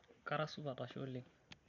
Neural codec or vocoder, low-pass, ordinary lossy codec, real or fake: none; 7.2 kHz; none; real